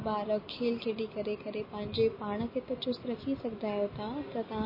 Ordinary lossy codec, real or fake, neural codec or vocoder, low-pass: MP3, 24 kbps; real; none; 5.4 kHz